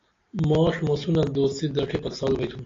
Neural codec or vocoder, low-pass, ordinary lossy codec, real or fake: none; 7.2 kHz; AAC, 32 kbps; real